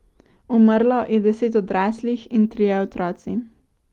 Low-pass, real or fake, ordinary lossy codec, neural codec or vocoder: 19.8 kHz; real; Opus, 24 kbps; none